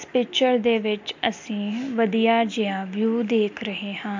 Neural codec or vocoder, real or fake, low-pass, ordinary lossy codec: none; real; 7.2 kHz; MP3, 64 kbps